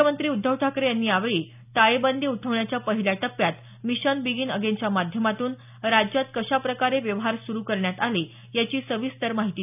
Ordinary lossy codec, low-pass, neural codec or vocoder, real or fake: none; 3.6 kHz; none; real